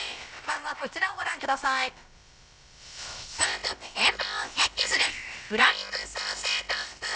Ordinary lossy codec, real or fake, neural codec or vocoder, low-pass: none; fake; codec, 16 kHz, about 1 kbps, DyCAST, with the encoder's durations; none